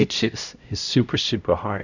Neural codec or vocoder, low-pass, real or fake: codec, 16 kHz, 0.5 kbps, X-Codec, HuBERT features, trained on LibriSpeech; 7.2 kHz; fake